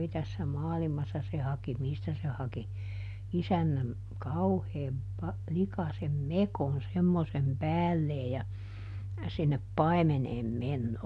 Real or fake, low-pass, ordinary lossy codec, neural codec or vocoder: real; none; none; none